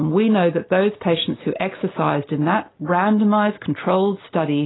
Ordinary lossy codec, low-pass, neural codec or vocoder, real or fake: AAC, 16 kbps; 7.2 kHz; none; real